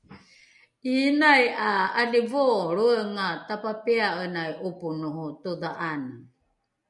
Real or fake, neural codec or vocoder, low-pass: real; none; 10.8 kHz